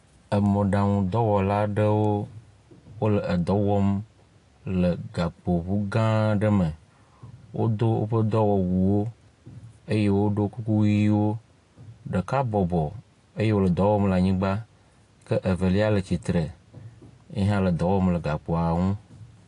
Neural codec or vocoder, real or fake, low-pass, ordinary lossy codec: none; real; 10.8 kHz; AAC, 48 kbps